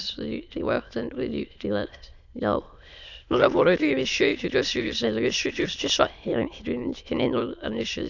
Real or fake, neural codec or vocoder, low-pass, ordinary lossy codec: fake; autoencoder, 22.05 kHz, a latent of 192 numbers a frame, VITS, trained on many speakers; 7.2 kHz; none